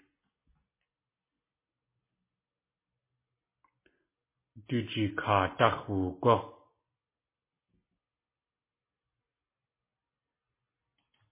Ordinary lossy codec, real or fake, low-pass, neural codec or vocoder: MP3, 16 kbps; real; 3.6 kHz; none